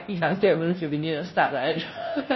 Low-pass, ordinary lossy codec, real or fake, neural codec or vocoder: 7.2 kHz; MP3, 24 kbps; fake; codec, 16 kHz, 0.5 kbps, FunCodec, trained on Chinese and English, 25 frames a second